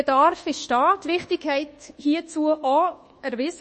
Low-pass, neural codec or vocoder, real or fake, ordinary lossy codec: 10.8 kHz; codec, 24 kHz, 1.2 kbps, DualCodec; fake; MP3, 32 kbps